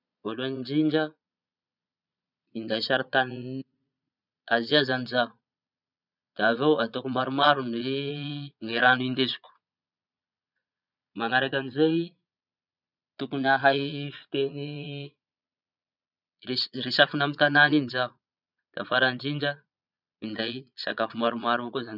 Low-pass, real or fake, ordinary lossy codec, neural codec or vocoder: 5.4 kHz; fake; none; vocoder, 22.05 kHz, 80 mel bands, Vocos